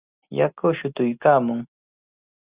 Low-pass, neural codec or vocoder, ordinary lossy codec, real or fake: 3.6 kHz; none; Opus, 64 kbps; real